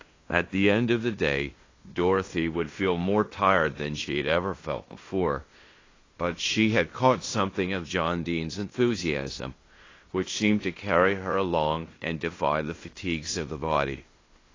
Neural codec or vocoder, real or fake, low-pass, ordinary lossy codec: codec, 16 kHz in and 24 kHz out, 0.9 kbps, LongCat-Audio-Codec, fine tuned four codebook decoder; fake; 7.2 kHz; AAC, 32 kbps